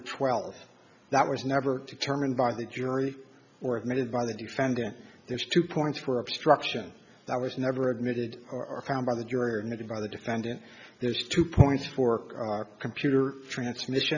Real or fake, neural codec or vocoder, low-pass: real; none; 7.2 kHz